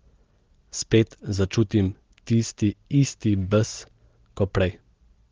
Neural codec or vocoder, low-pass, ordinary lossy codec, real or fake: none; 7.2 kHz; Opus, 16 kbps; real